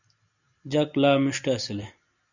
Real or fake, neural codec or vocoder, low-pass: real; none; 7.2 kHz